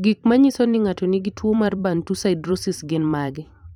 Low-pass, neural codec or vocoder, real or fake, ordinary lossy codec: 19.8 kHz; vocoder, 44.1 kHz, 128 mel bands every 512 samples, BigVGAN v2; fake; none